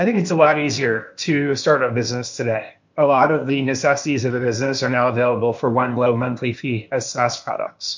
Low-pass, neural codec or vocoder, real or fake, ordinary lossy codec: 7.2 kHz; codec, 16 kHz, 0.8 kbps, ZipCodec; fake; MP3, 64 kbps